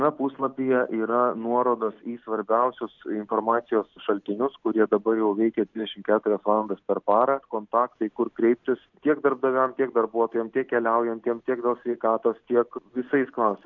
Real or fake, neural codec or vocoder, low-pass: real; none; 7.2 kHz